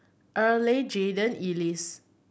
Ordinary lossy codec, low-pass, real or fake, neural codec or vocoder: none; none; real; none